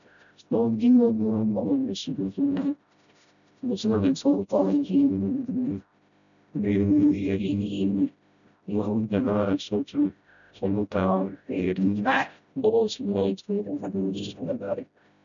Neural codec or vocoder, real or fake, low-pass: codec, 16 kHz, 0.5 kbps, FreqCodec, smaller model; fake; 7.2 kHz